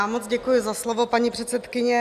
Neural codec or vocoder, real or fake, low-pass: none; real; 14.4 kHz